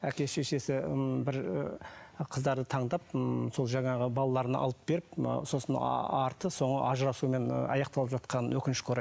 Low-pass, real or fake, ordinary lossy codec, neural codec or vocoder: none; real; none; none